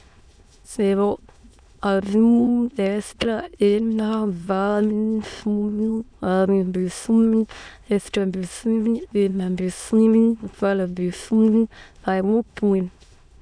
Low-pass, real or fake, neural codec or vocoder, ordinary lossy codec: 9.9 kHz; fake; autoencoder, 22.05 kHz, a latent of 192 numbers a frame, VITS, trained on many speakers; none